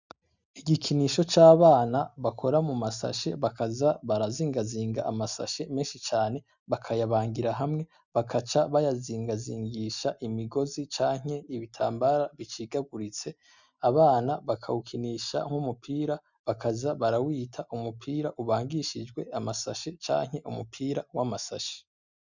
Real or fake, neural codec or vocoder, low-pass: real; none; 7.2 kHz